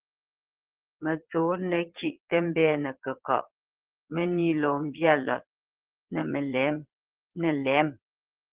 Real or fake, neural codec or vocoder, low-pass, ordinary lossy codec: fake; vocoder, 22.05 kHz, 80 mel bands, Vocos; 3.6 kHz; Opus, 16 kbps